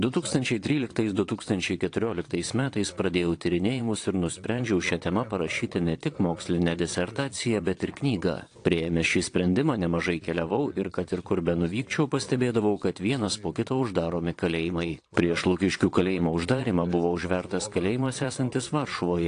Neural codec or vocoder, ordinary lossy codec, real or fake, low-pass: vocoder, 22.05 kHz, 80 mel bands, Vocos; AAC, 48 kbps; fake; 9.9 kHz